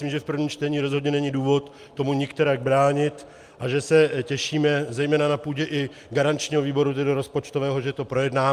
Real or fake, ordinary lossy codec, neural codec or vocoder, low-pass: real; Opus, 32 kbps; none; 14.4 kHz